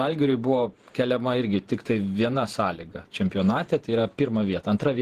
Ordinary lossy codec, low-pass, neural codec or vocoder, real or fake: Opus, 16 kbps; 14.4 kHz; vocoder, 48 kHz, 128 mel bands, Vocos; fake